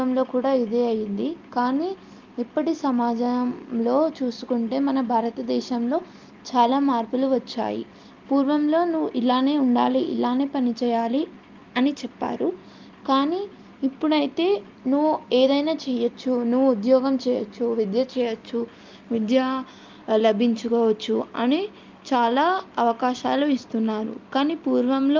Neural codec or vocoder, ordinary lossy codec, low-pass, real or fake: none; Opus, 24 kbps; 7.2 kHz; real